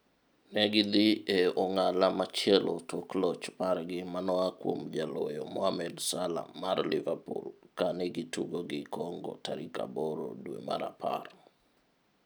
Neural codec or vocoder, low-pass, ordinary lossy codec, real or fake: none; none; none; real